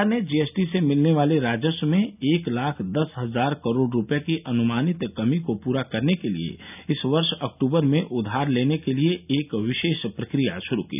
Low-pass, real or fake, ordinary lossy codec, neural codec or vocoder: 3.6 kHz; real; none; none